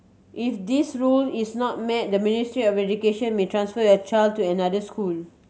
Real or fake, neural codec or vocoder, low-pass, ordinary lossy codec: real; none; none; none